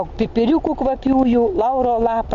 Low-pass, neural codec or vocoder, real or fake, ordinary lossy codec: 7.2 kHz; none; real; MP3, 48 kbps